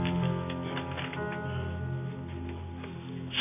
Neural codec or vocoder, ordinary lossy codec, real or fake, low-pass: none; none; real; 3.6 kHz